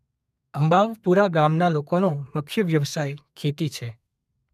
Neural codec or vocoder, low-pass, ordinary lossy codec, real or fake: codec, 32 kHz, 1.9 kbps, SNAC; 14.4 kHz; none; fake